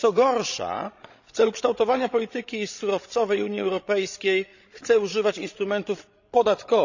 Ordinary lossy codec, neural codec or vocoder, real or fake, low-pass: none; codec, 16 kHz, 16 kbps, FreqCodec, larger model; fake; 7.2 kHz